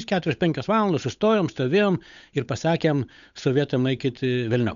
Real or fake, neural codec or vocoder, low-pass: fake; codec, 16 kHz, 8 kbps, FunCodec, trained on Chinese and English, 25 frames a second; 7.2 kHz